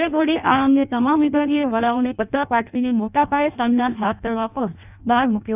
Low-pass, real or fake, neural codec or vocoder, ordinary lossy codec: 3.6 kHz; fake; codec, 16 kHz in and 24 kHz out, 0.6 kbps, FireRedTTS-2 codec; none